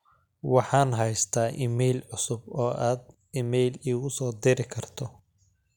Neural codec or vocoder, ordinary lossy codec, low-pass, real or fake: none; none; 19.8 kHz; real